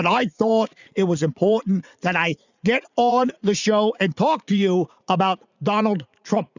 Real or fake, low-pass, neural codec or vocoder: fake; 7.2 kHz; vocoder, 44.1 kHz, 80 mel bands, Vocos